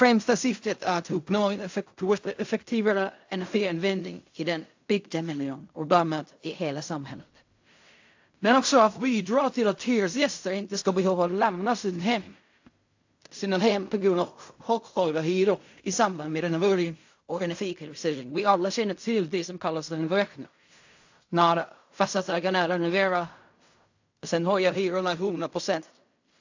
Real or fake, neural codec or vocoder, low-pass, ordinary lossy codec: fake; codec, 16 kHz in and 24 kHz out, 0.4 kbps, LongCat-Audio-Codec, fine tuned four codebook decoder; 7.2 kHz; AAC, 48 kbps